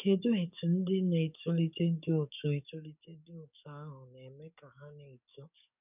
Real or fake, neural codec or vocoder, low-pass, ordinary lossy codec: fake; codec, 44.1 kHz, 7.8 kbps, DAC; 3.6 kHz; none